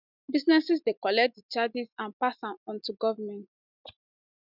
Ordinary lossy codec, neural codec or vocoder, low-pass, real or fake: none; none; 5.4 kHz; real